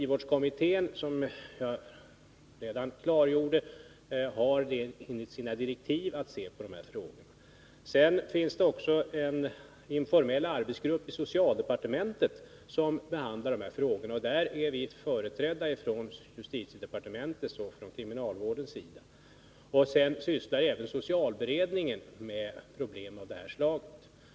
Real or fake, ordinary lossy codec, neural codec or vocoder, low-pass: real; none; none; none